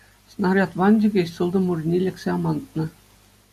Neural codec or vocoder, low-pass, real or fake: none; 14.4 kHz; real